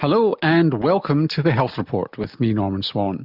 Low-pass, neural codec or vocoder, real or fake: 5.4 kHz; none; real